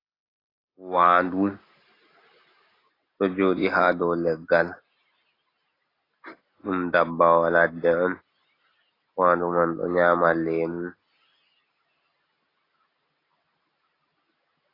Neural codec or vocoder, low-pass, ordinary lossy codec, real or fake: none; 5.4 kHz; AAC, 24 kbps; real